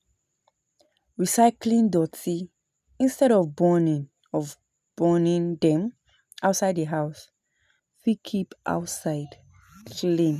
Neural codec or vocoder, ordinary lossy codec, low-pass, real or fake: none; none; 14.4 kHz; real